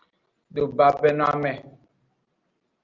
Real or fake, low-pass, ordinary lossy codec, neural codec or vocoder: real; 7.2 kHz; Opus, 32 kbps; none